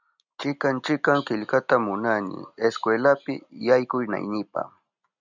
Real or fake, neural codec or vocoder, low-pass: real; none; 7.2 kHz